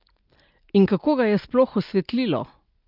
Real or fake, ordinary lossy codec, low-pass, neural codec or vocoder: real; Opus, 32 kbps; 5.4 kHz; none